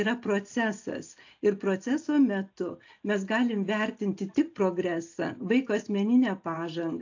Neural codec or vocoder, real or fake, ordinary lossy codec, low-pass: none; real; AAC, 48 kbps; 7.2 kHz